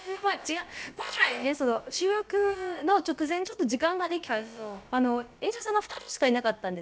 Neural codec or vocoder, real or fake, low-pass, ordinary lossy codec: codec, 16 kHz, about 1 kbps, DyCAST, with the encoder's durations; fake; none; none